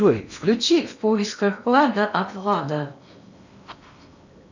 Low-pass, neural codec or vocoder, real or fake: 7.2 kHz; codec, 16 kHz in and 24 kHz out, 0.8 kbps, FocalCodec, streaming, 65536 codes; fake